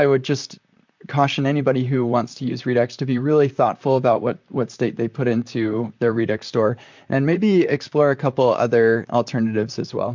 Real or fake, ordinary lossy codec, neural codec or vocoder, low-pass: fake; MP3, 64 kbps; vocoder, 44.1 kHz, 128 mel bands, Pupu-Vocoder; 7.2 kHz